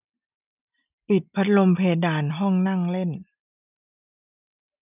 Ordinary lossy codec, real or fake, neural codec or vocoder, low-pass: none; real; none; 3.6 kHz